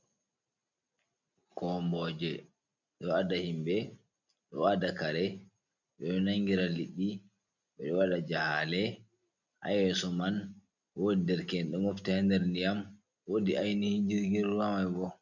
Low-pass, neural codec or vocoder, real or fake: 7.2 kHz; none; real